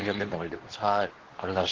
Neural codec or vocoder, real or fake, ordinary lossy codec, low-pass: codec, 24 kHz, 0.9 kbps, WavTokenizer, medium speech release version 2; fake; Opus, 16 kbps; 7.2 kHz